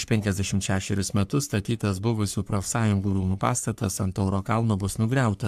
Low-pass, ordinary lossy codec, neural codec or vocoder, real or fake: 14.4 kHz; AAC, 96 kbps; codec, 44.1 kHz, 3.4 kbps, Pupu-Codec; fake